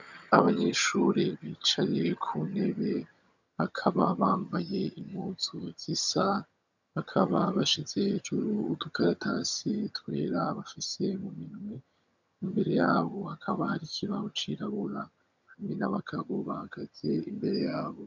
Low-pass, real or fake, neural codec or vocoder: 7.2 kHz; fake; vocoder, 22.05 kHz, 80 mel bands, HiFi-GAN